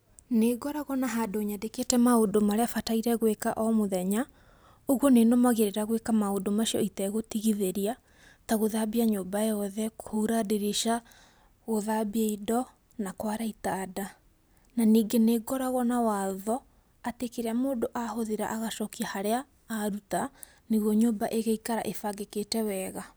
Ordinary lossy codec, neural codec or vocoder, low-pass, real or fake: none; none; none; real